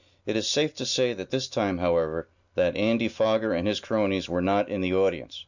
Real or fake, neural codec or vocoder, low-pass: real; none; 7.2 kHz